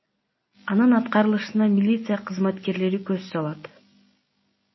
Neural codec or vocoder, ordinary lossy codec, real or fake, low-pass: none; MP3, 24 kbps; real; 7.2 kHz